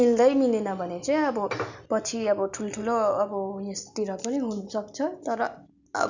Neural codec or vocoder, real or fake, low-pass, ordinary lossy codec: none; real; 7.2 kHz; none